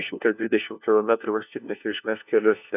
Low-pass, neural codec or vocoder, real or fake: 3.6 kHz; codec, 16 kHz, 1 kbps, FunCodec, trained on LibriTTS, 50 frames a second; fake